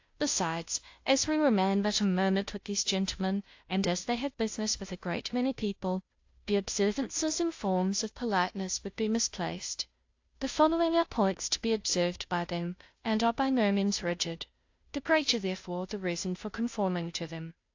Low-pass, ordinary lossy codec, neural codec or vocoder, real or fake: 7.2 kHz; AAC, 48 kbps; codec, 16 kHz, 0.5 kbps, FunCodec, trained on Chinese and English, 25 frames a second; fake